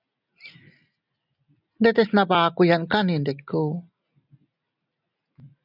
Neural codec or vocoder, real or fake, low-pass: none; real; 5.4 kHz